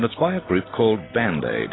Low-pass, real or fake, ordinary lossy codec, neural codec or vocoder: 7.2 kHz; real; AAC, 16 kbps; none